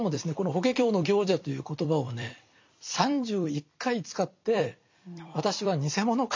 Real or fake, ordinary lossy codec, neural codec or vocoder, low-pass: fake; MP3, 48 kbps; vocoder, 44.1 kHz, 128 mel bands every 256 samples, BigVGAN v2; 7.2 kHz